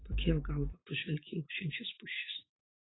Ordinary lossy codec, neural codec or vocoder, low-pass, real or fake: AAC, 16 kbps; vocoder, 44.1 kHz, 128 mel bands every 512 samples, BigVGAN v2; 7.2 kHz; fake